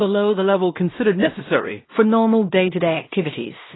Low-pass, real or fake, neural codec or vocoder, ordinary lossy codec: 7.2 kHz; fake; codec, 16 kHz in and 24 kHz out, 0.4 kbps, LongCat-Audio-Codec, two codebook decoder; AAC, 16 kbps